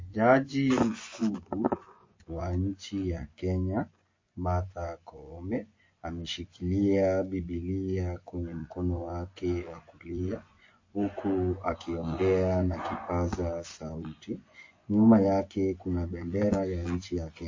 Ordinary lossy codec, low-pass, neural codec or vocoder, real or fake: MP3, 32 kbps; 7.2 kHz; vocoder, 24 kHz, 100 mel bands, Vocos; fake